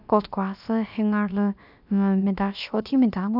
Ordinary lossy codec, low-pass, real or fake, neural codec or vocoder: none; 5.4 kHz; fake; codec, 16 kHz, about 1 kbps, DyCAST, with the encoder's durations